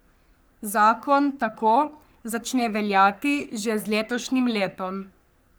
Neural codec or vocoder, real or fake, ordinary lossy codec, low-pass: codec, 44.1 kHz, 3.4 kbps, Pupu-Codec; fake; none; none